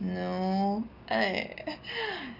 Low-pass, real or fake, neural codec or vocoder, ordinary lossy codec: 5.4 kHz; real; none; none